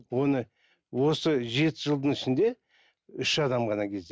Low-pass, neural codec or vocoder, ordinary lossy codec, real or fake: none; none; none; real